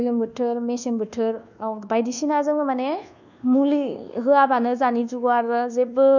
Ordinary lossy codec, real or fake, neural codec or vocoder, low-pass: none; fake; codec, 24 kHz, 1.2 kbps, DualCodec; 7.2 kHz